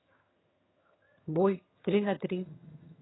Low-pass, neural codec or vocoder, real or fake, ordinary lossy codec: 7.2 kHz; vocoder, 22.05 kHz, 80 mel bands, HiFi-GAN; fake; AAC, 16 kbps